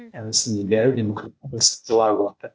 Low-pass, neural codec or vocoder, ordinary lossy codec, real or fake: none; codec, 16 kHz, 0.8 kbps, ZipCodec; none; fake